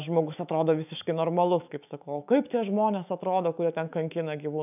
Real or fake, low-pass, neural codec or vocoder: fake; 3.6 kHz; autoencoder, 48 kHz, 128 numbers a frame, DAC-VAE, trained on Japanese speech